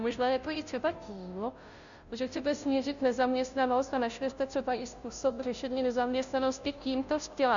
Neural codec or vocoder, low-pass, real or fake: codec, 16 kHz, 0.5 kbps, FunCodec, trained on Chinese and English, 25 frames a second; 7.2 kHz; fake